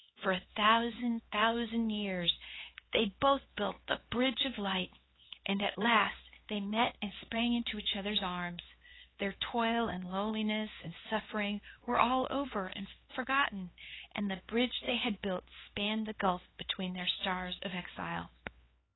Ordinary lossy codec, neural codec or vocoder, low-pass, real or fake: AAC, 16 kbps; codec, 16 kHz, 4 kbps, X-Codec, HuBERT features, trained on LibriSpeech; 7.2 kHz; fake